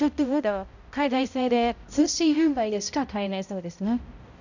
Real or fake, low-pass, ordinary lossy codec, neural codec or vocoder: fake; 7.2 kHz; none; codec, 16 kHz, 0.5 kbps, X-Codec, HuBERT features, trained on balanced general audio